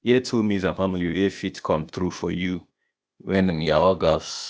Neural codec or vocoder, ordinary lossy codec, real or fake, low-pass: codec, 16 kHz, 0.8 kbps, ZipCodec; none; fake; none